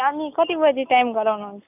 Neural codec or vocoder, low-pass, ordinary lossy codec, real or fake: none; 3.6 kHz; none; real